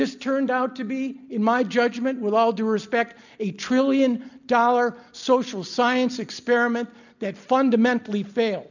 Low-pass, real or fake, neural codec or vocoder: 7.2 kHz; real; none